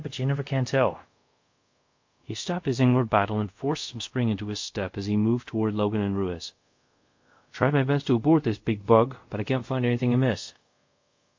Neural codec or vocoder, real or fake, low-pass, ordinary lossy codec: codec, 24 kHz, 0.5 kbps, DualCodec; fake; 7.2 kHz; MP3, 48 kbps